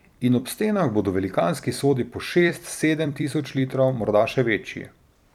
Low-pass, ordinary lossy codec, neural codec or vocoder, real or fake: 19.8 kHz; none; vocoder, 44.1 kHz, 128 mel bands every 256 samples, BigVGAN v2; fake